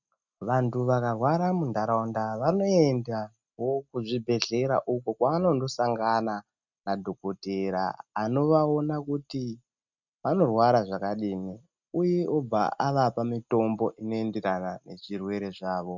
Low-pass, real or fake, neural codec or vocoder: 7.2 kHz; real; none